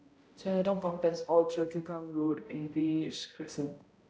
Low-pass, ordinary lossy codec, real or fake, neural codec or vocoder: none; none; fake; codec, 16 kHz, 0.5 kbps, X-Codec, HuBERT features, trained on balanced general audio